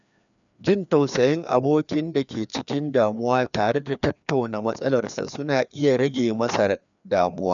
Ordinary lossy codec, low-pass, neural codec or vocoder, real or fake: none; 7.2 kHz; codec, 16 kHz, 2 kbps, FreqCodec, larger model; fake